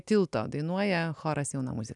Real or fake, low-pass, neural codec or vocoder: real; 10.8 kHz; none